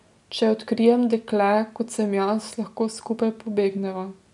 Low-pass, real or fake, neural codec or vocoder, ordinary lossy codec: 10.8 kHz; real; none; none